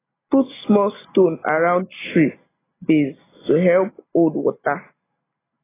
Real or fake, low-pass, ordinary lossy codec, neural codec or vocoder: real; 3.6 kHz; AAC, 16 kbps; none